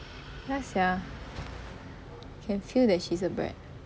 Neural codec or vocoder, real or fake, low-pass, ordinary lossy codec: none; real; none; none